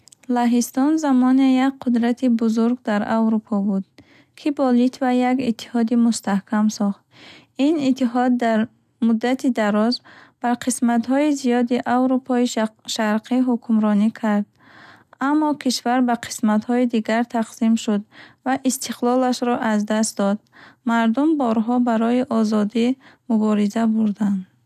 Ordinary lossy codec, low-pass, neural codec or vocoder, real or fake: none; 14.4 kHz; none; real